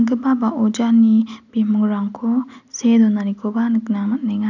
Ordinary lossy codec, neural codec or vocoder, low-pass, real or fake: none; none; 7.2 kHz; real